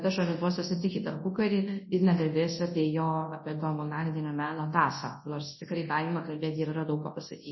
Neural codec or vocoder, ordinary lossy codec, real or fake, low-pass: codec, 24 kHz, 0.9 kbps, WavTokenizer, large speech release; MP3, 24 kbps; fake; 7.2 kHz